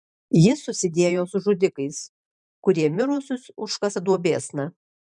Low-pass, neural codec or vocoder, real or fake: 10.8 kHz; vocoder, 44.1 kHz, 128 mel bands every 512 samples, BigVGAN v2; fake